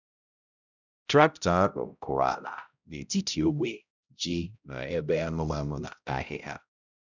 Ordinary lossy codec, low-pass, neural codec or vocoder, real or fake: none; 7.2 kHz; codec, 16 kHz, 0.5 kbps, X-Codec, HuBERT features, trained on balanced general audio; fake